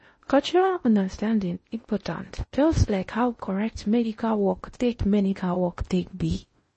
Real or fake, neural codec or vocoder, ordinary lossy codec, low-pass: fake; codec, 16 kHz in and 24 kHz out, 0.6 kbps, FocalCodec, streaming, 2048 codes; MP3, 32 kbps; 10.8 kHz